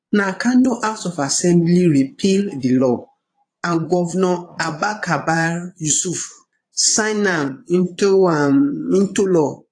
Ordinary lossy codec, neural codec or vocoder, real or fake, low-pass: AAC, 48 kbps; vocoder, 22.05 kHz, 80 mel bands, Vocos; fake; 9.9 kHz